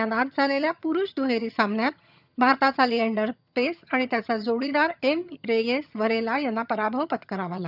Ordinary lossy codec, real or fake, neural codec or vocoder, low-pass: none; fake; vocoder, 22.05 kHz, 80 mel bands, HiFi-GAN; 5.4 kHz